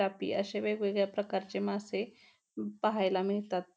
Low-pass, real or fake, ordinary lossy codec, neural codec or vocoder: none; real; none; none